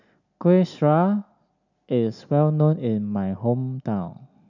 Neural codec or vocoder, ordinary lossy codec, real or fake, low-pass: none; none; real; 7.2 kHz